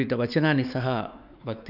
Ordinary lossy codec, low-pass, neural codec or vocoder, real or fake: none; 5.4 kHz; codec, 16 kHz, 4 kbps, X-Codec, WavLM features, trained on Multilingual LibriSpeech; fake